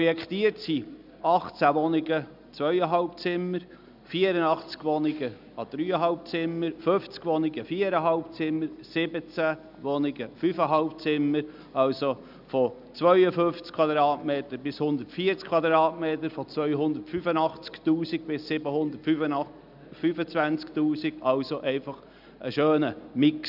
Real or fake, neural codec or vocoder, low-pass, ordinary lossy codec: real; none; 5.4 kHz; none